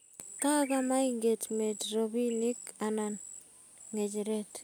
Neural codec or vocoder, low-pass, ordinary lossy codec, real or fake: none; none; none; real